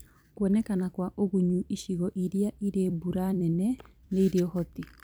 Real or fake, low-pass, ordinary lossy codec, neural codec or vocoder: fake; none; none; vocoder, 44.1 kHz, 128 mel bands every 256 samples, BigVGAN v2